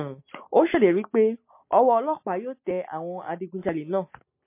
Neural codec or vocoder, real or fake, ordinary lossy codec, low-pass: none; real; MP3, 24 kbps; 3.6 kHz